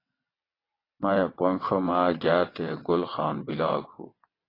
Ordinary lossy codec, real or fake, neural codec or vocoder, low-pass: AAC, 24 kbps; fake; vocoder, 22.05 kHz, 80 mel bands, WaveNeXt; 5.4 kHz